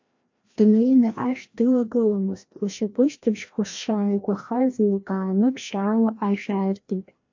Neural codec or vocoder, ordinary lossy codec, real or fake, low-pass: codec, 16 kHz, 1 kbps, FreqCodec, larger model; MP3, 48 kbps; fake; 7.2 kHz